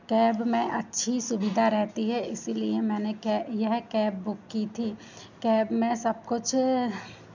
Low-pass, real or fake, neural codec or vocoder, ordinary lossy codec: 7.2 kHz; real; none; none